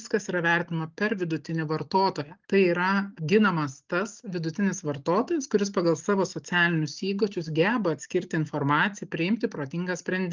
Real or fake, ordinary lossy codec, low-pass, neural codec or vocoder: real; Opus, 32 kbps; 7.2 kHz; none